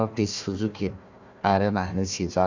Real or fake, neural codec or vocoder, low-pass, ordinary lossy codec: fake; codec, 16 kHz, 1 kbps, FunCodec, trained on Chinese and English, 50 frames a second; 7.2 kHz; Opus, 64 kbps